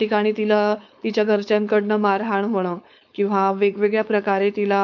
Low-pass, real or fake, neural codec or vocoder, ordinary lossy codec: 7.2 kHz; fake; codec, 16 kHz, 4.8 kbps, FACodec; MP3, 64 kbps